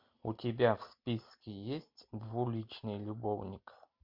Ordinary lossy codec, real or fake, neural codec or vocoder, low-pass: MP3, 48 kbps; real; none; 5.4 kHz